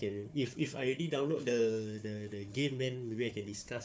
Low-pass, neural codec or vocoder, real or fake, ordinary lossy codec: none; codec, 16 kHz, 4 kbps, FunCodec, trained on Chinese and English, 50 frames a second; fake; none